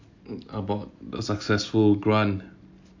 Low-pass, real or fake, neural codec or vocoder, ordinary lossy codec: 7.2 kHz; real; none; MP3, 48 kbps